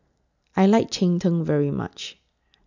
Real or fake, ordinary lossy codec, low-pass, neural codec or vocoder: real; none; 7.2 kHz; none